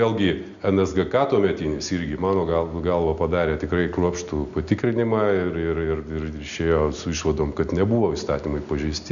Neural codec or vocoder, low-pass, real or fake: none; 7.2 kHz; real